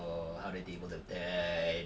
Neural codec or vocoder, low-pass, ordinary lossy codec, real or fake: none; none; none; real